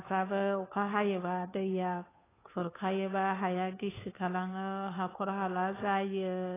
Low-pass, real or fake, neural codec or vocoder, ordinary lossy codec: 3.6 kHz; fake; codec, 16 kHz, 2 kbps, FunCodec, trained on Chinese and English, 25 frames a second; AAC, 16 kbps